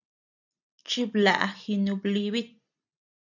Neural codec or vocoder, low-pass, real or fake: none; 7.2 kHz; real